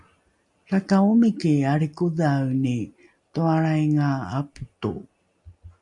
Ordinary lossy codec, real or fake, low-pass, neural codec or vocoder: MP3, 64 kbps; real; 10.8 kHz; none